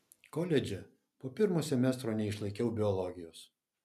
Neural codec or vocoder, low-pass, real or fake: none; 14.4 kHz; real